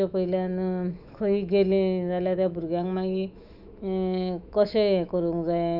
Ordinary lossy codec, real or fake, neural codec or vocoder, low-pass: none; fake; autoencoder, 48 kHz, 128 numbers a frame, DAC-VAE, trained on Japanese speech; 5.4 kHz